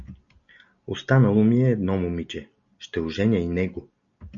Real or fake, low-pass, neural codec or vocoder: real; 7.2 kHz; none